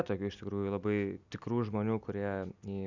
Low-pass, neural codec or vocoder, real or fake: 7.2 kHz; none; real